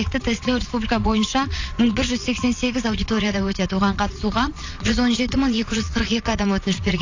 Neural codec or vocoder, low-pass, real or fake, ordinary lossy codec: vocoder, 22.05 kHz, 80 mel bands, Vocos; 7.2 kHz; fake; AAC, 48 kbps